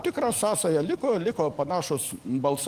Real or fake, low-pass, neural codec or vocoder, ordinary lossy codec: fake; 14.4 kHz; codec, 44.1 kHz, 7.8 kbps, DAC; Opus, 24 kbps